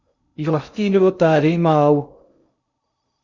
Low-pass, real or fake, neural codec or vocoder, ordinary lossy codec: 7.2 kHz; fake; codec, 16 kHz in and 24 kHz out, 0.6 kbps, FocalCodec, streaming, 2048 codes; Opus, 64 kbps